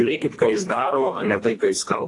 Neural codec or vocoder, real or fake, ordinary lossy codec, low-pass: codec, 24 kHz, 1.5 kbps, HILCodec; fake; AAC, 48 kbps; 10.8 kHz